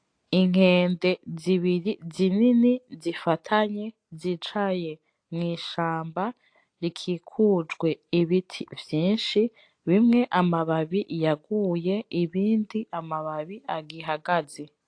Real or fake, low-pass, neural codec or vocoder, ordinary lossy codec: real; 9.9 kHz; none; AAC, 48 kbps